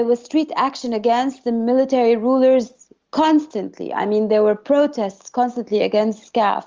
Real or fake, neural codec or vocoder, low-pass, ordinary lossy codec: real; none; 7.2 kHz; Opus, 32 kbps